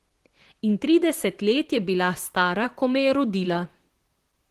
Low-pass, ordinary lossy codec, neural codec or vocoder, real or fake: 14.4 kHz; Opus, 16 kbps; vocoder, 44.1 kHz, 128 mel bands, Pupu-Vocoder; fake